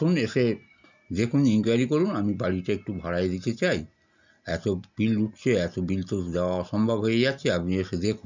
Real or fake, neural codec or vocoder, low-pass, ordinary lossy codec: real; none; 7.2 kHz; none